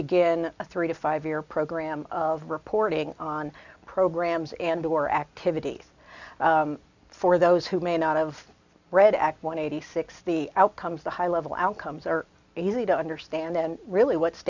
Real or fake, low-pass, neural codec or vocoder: real; 7.2 kHz; none